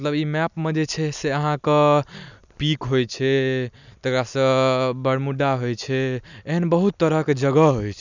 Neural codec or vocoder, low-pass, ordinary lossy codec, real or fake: none; 7.2 kHz; none; real